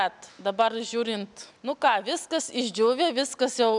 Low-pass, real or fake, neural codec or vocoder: 10.8 kHz; real; none